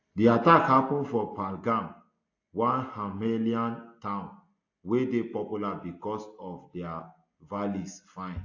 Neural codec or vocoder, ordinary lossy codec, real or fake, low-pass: none; AAC, 48 kbps; real; 7.2 kHz